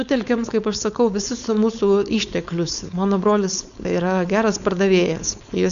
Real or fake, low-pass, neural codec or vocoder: fake; 7.2 kHz; codec, 16 kHz, 4.8 kbps, FACodec